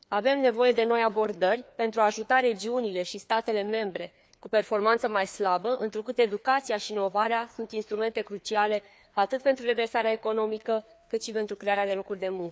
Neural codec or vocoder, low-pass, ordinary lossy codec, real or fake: codec, 16 kHz, 2 kbps, FreqCodec, larger model; none; none; fake